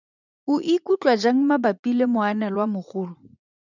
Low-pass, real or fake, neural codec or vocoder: 7.2 kHz; fake; vocoder, 44.1 kHz, 80 mel bands, Vocos